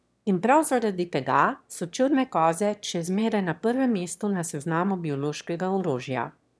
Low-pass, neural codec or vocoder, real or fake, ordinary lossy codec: none; autoencoder, 22.05 kHz, a latent of 192 numbers a frame, VITS, trained on one speaker; fake; none